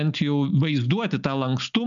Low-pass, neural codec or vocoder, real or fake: 7.2 kHz; none; real